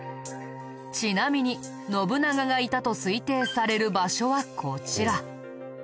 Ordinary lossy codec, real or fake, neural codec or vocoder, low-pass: none; real; none; none